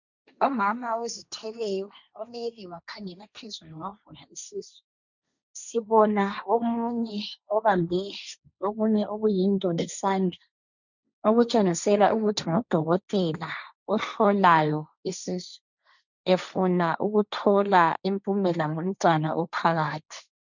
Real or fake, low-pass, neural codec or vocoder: fake; 7.2 kHz; codec, 16 kHz, 1.1 kbps, Voila-Tokenizer